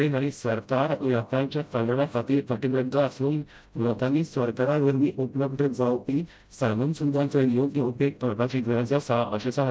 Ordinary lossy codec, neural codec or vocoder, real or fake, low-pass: none; codec, 16 kHz, 0.5 kbps, FreqCodec, smaller model; fake; none